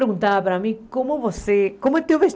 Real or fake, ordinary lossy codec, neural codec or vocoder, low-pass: real; none; none; none